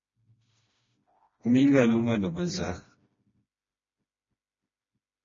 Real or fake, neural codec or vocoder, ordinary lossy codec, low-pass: fake; codec, 16 kHz, 1 kbps, FreqCodec, smaller model; MP3, 32 kbps; 7.2 kHz